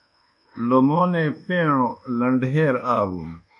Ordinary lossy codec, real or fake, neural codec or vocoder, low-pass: AAC, 64 kbps; fake; codec, 24 kHz, 1.2 kbps, DualCodec; 10.8 kHz